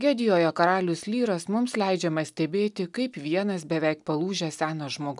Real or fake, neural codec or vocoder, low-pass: real; none; 10.8 kHz